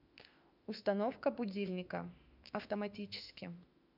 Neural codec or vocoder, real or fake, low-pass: autoencoder, 48 kHz, 32 numbers a frame, DAC-VAE, trained on Japanese speech; fake; 5.4 kHz